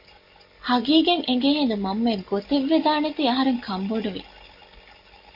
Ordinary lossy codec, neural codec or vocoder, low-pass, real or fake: MP3, 32 kbps; none; 5.4 kHz; real